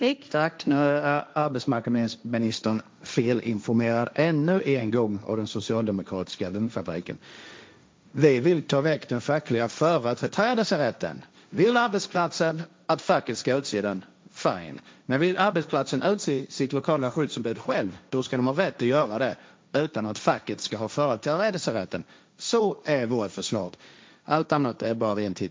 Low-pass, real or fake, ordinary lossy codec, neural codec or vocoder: none; fake; none; codec, 16 kHz, 1.1 kbps, Voila-Tokenizer